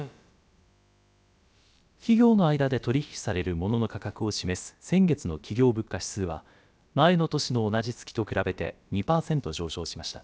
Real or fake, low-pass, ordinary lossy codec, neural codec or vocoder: fake; none; none; codec, 16 kHz, about 1 kbps, DyCAST, with the encoder's durations